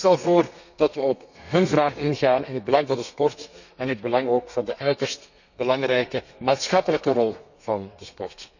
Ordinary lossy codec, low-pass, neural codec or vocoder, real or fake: none; 7.2 kHz; codec, 32 kHz, 1.9 kbps, SNAC; fake